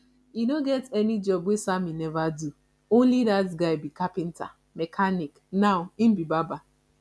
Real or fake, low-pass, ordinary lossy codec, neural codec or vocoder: real; none; none; none